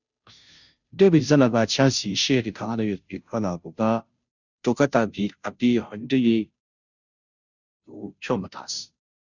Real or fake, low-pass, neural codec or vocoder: fake; 7.2 kHz; codec, 16 kHz, 0.5 kbps, FunCodec, trained on Chinese and English, 25 frames a second